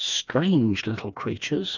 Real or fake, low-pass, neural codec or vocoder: fake; 7.2 kHz; codec, 16 kHz, 2 kbps, FreqCodec, smaller model